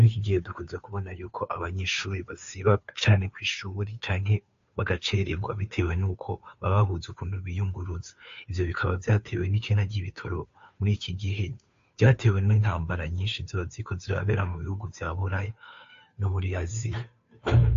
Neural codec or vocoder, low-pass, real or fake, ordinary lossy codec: codec, 16 kHz, 2 kbps, FunCodec, trained on Chinese and English, 25 frames a second; 7.2 kHz; fake; AAC, 48 kbps